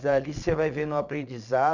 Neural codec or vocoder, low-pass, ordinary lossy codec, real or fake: vocoder, 22.05 kHz, 80 mel bands, Vocos; 7.2 kHz; none; fake